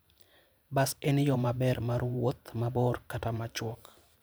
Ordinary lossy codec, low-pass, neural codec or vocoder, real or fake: none; none; vocoder, 44.1 kHz, 128 mel bands every 512 samples, BigVGAN v2; fake